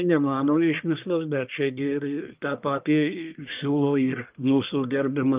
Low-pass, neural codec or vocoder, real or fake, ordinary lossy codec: 3.6 kHz; codec, 44.1 kHz, 1.7 kbps, Pupu-Codec; fake; Opus, 24 kbps